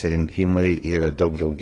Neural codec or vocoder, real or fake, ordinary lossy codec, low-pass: codec, 24 kHz, 1 kbps, SNAC; fake; AAC, 32 kbps; 10.8 kHz